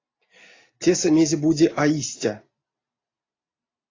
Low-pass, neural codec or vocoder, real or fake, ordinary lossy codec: 7.2 kHz; none; real; AAC, 32 kbps